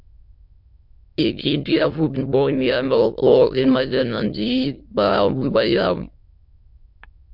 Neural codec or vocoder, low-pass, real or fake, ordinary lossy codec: autoencoder, 22.05 kHz, a latent of 192 numbers a frame, VITS, trained on many speakers; 5.4 kHz; fake; MP3, 48 kbps